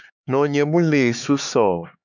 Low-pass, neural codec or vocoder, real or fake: 7.2 kHz; codec, 16 kHz, 4 kbps, X-Codec, HuBERT features, trained on LibriSpeech; fake